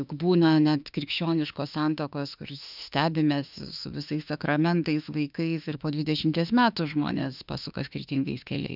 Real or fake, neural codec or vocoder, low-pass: fake; autoencoder, 48 kHz, 32 numbers a frame, DAC-VAE, trained on Japanese speech; 5.4 kHz